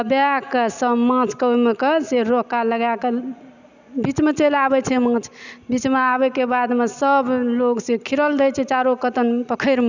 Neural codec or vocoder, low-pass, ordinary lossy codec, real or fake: none; 7.2 kHz; none; real